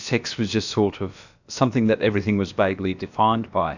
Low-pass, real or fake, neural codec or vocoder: 7.2 kHz; fake; codec, 16 kHz, about 1 kbps, DyCAST, with the encoder's durations